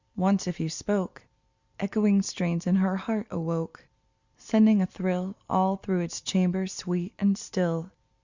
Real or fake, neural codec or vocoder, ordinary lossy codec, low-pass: real; none; Opus, 64 kbps; 7.2 kHz